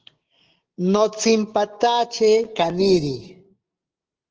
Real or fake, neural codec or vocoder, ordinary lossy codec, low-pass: fake; codec, 16 kHz, 6 kbps, DAC; Opus, 16 kbps; 7.2 kHz